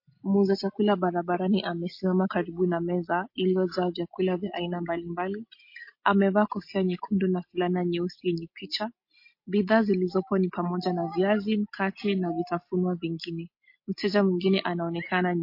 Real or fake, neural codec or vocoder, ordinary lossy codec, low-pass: real; none; MP3, 32 kbps; 5.4 kHz